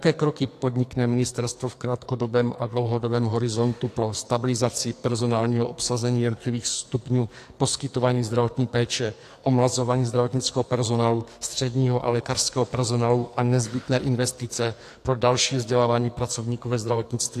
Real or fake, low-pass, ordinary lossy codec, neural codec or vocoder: fake; 14.4 kHz; AAC, 64 kbps; codec, 44.1 kHz, 2.6 kbps, SNAC